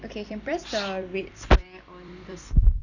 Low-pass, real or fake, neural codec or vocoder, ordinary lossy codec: 7.2 kHz; fake; vocoder, 44.1 kHz, 128 mel bands every 256 samples, BigVGAN v2; none